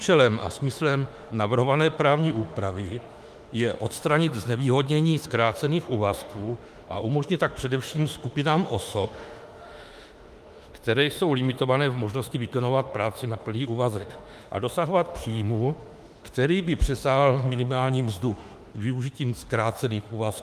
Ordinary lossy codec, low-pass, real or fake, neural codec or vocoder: Opus, 32 kbps; 14.4 kHz; fake; autoencoder, 48 kHz, 32 numbers a frame, DAC-VAE, trained on Japanese speech